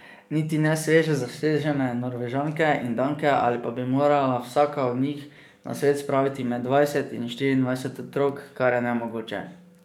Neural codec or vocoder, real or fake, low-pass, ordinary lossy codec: codec, 44.1 kHz, 7.8 kbps, DAC; fake; 19.8 kHz; none